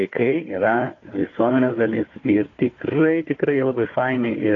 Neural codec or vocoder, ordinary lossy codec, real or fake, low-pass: codec, 16 kHz, 4 kbps, FunCodec, trained on Chinese and English, 50 frames a second; AAC, 32 kbps; fake; 7.2 kHz